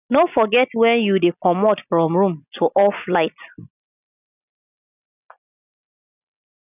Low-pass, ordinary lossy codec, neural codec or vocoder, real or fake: 3.6 kHz; none; none; real